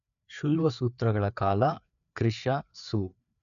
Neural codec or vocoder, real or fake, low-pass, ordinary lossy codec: codec, 16 kHz, 4 kbps, FreqCodec, larger model; fake; 7.2 kHz; none